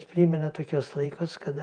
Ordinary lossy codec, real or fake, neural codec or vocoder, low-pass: Opus, 32 kbps; fake; vocoder, 48 kHz, 128 mel bands, Vocos; 9.9 kHz